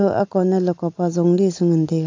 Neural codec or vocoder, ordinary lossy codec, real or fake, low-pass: none; AAC, 48 kbps; real; 7.2 kHz